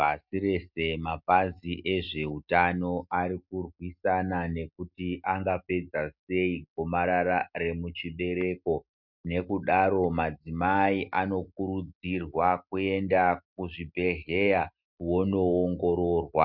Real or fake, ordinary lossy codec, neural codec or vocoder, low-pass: real; MP3, 48 kbps; none; 5.4 kHz